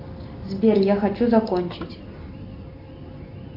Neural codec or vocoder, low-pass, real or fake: none; 5.4 kHz; real